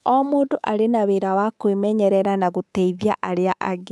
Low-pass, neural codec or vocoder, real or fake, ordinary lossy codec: none; codec, 24 kHz, 3.1 kbps, DualCodec; fake; none